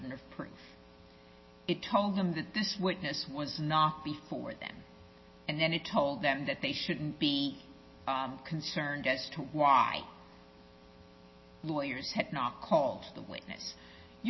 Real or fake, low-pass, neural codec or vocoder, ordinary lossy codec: real; 7.2 kHz; none; MP3, 24 kbps